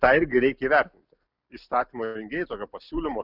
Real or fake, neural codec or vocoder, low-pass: real; none; 5.4 kHz